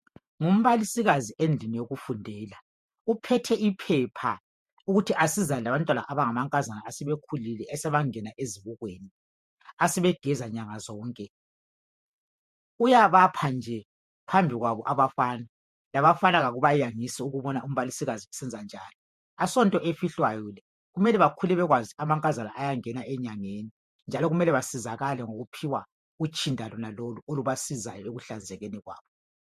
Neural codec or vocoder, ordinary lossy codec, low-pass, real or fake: vocoder, 48 kHz, 128 mel bands, Vocos; MP3, 64 kbps; 14.4 kHz; fake